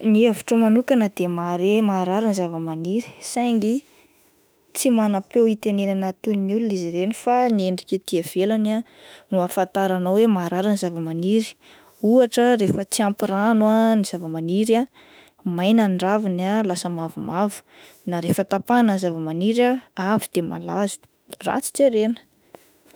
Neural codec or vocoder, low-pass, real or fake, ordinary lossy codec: autoencoder, 48 kHz, 32 numbers a frame, DAC-VAE, trained on Japanese speech; none; fake; none